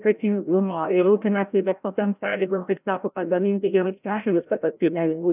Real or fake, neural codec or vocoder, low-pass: fake; codec, 16 kHz, 0.5 kbps, FreqCodec, larger model; 3.6 kHz